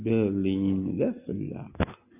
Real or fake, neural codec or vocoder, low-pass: real; none; 3.6 kHz